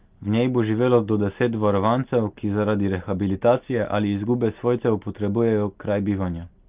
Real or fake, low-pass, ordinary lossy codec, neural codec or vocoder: real; 3.6 kHz; Opus, 32 kbps; none